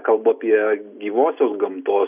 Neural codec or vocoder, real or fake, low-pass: none; real; 3.6 kHz